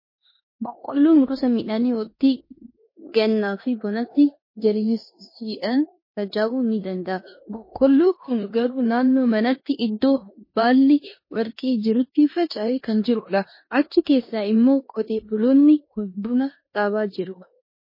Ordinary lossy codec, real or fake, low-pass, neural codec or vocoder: MP3, 24 kbps; fake; 5.4 kHz; codec, 16 kHz in and 24 kHz out, 0.9 kbps, LongCat-Audio-Codec, four codebook decoder